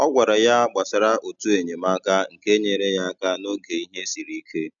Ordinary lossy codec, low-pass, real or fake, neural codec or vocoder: none; 7.2 kHz; real; none